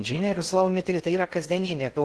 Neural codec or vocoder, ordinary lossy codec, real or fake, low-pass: codec, 16 kHz in and 24 kHz out, 0.6 kbps, FocalCodec, streaming, 2048 codes; Opus, 16 kbps; fake; 10.8 kHz